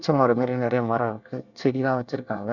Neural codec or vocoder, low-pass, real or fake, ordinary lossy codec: codec, 24 kHz, 1 kbps, SNAC; 7.2 kHz; fake; none